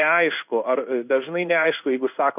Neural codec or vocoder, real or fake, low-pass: codec, 16 kHz in and 24 kHz out, 1 kbps, XY-Tokenizer; fake; 3.6 kHz